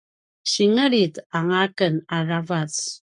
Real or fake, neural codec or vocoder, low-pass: fake; codec, 44.1 kHz, 7.8 kbps, Pupu-Codec; 10.8 kHz